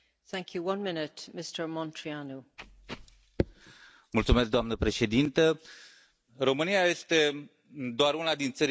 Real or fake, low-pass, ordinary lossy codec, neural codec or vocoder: real; none; none; none